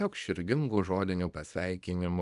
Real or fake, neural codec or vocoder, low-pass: fake; codec, 24 kHz, 0.9 kbps, WavTokenizer, small release; 10.8 kHz